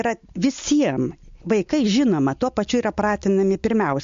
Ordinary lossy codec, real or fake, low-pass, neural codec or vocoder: MP3, 48 kbps; real; 7.2 kHz; none